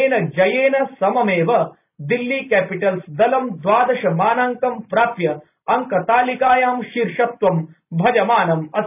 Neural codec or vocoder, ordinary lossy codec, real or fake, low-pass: none; none; real; 3.6 kHz